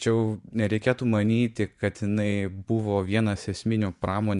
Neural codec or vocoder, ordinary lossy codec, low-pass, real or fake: vocoder, 24 kHz, 100 mel bands, Vocos; MP3, 96 kbps; 10.8 kHz; fake